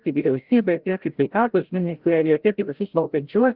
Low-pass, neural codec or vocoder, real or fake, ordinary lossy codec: 5.4 kHz; codec, 16 kHz, 0.5 kbps, FreqCodec, larger model; fake; Opus, 16 kbps